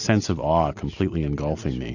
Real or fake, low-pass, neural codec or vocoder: real; 7.2 kHz; none